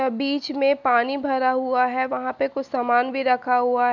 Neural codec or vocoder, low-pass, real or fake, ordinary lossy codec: none; 7.2 kHz; real; none